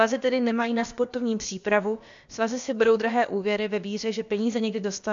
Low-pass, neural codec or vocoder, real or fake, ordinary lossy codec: 7.2 kHz; codec, 16 kHz, about 1 kbps, DyCAST, with the encoder's durations; fake; AAC, 64 kbps